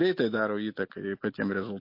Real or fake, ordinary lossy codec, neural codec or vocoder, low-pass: real; MP3, 32 kbps; none; 5.4 kHz